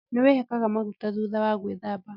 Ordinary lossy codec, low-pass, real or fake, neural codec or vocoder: none; 5.4 kHz; real; none